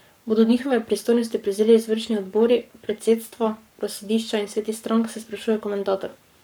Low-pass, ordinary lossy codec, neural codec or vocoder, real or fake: none; none; codec, 44.1 kHz, 7.8 kbps, Pupu-Codec; fake